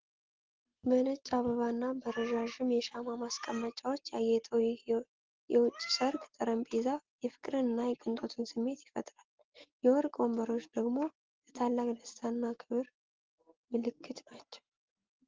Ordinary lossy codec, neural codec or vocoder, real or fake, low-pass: Opus, 16 kbps; none; real; 7.2 kHz